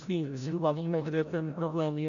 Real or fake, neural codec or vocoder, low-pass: fake; codec, 16 kHz, 0.5 kbps, FreqCodec, larger model; 7.2 kHz